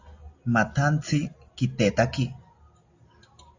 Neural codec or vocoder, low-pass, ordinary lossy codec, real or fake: none; 7.2 kHz; AAC, 48 kbps; real